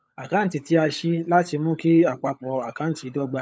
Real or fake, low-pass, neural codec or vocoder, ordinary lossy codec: fake; none; codec, 16 kHz, 16 kbps, FunCodec, trained on LibriTTS, 50 frames a second; none